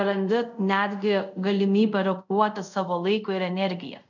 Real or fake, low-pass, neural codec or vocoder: fake; 7.2 kHz; codec, 24 kHz, 0.5 kbps, DualCodec